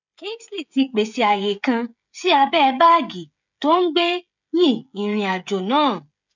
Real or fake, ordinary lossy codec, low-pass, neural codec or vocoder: fake; none; 7.2 kHz; codec, 16 kHz, 16 kbps, FreqCodec, smaller model